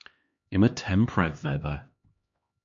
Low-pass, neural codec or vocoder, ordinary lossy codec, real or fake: 7.2 kHz; codec, 16 kHz, 2 kbps, X-Codec, HuBERT features, trained on LibriSpeech; MP3, 48 kbps; fake